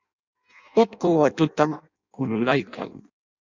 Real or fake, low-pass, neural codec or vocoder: fake; 7.2 kHz; codec, 16 kHz in and 24 kHz out, 0.6 kbps, FireRedTTS-2 codec